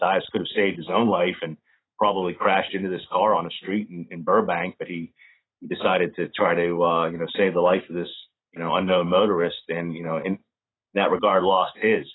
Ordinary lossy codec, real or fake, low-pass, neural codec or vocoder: AAC, 16 kbps; fake; 7.2 kHz; vocoder, 44.1 kHz, 128 mel bands every 512 samples, BigVGAN v2